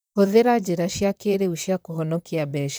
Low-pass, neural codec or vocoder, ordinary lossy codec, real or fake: none; vocoder, 44.1 kHz, 128 mel bands, Pupu-Vocoder; none; fake